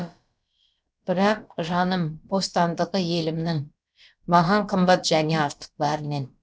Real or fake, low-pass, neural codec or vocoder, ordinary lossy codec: fake; none; codec, 16 kHz, about 1 kbps, DyCAST, with the encoder's durations; none